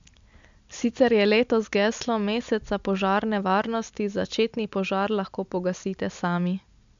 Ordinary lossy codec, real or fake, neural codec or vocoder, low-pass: MP3, 64 kbps; real; none; 7.2 kHz